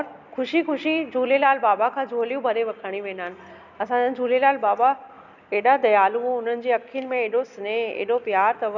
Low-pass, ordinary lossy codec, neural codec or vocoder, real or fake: 7.2 kHz; none; none; real